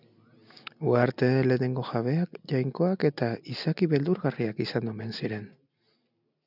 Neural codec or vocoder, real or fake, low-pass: none; real; 5.4 kHz